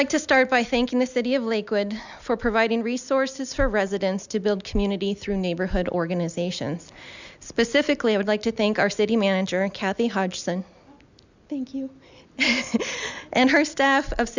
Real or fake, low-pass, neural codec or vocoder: real; 7.2 kHz; none